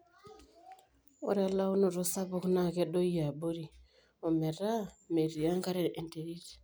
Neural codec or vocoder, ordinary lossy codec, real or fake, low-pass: none; none; real; none